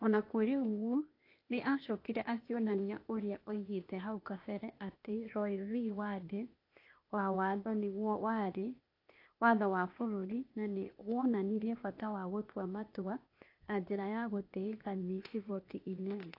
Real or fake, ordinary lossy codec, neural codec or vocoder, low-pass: fake; AAC, 32 kbps; codec, 16 kHz, 0.8 kbps, ZipCodec; 5.4 kHz